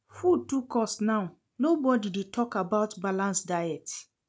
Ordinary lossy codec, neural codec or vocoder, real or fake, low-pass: none; none; real; none